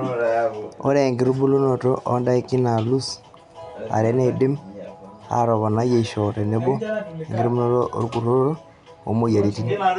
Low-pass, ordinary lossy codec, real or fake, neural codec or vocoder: 10.8 kHz; none; real; none